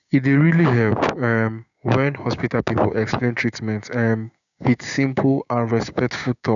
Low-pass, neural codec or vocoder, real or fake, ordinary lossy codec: 7.2 kHz; codec, 16 kHz, 6 kbps, DAC; fake; none